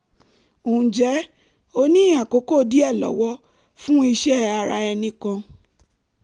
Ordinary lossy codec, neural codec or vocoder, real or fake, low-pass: Opus, 32 kbps; none; real; 10.8 kHz